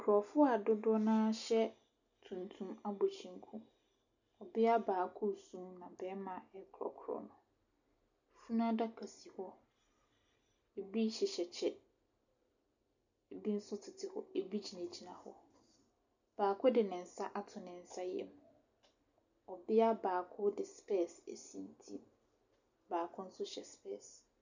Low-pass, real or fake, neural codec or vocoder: 7.2 kHz; real; none